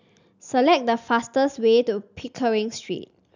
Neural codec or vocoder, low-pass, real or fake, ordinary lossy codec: none; 7.2 kHz; real; none